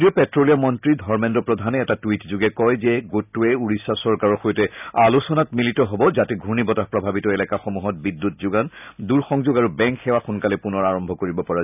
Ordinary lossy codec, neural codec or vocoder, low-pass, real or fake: none; none; 3.6 kHz; real